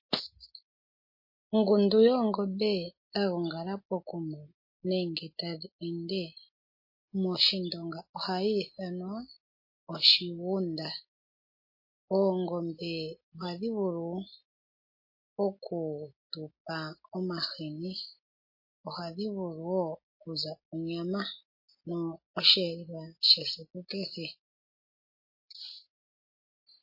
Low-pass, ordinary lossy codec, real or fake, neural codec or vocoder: 5.4 kHz; MP3, 24 kbps; real; none